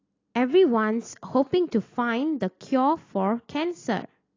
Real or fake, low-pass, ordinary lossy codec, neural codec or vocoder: real; 7.2 kHz; AAC, 32 kbps; none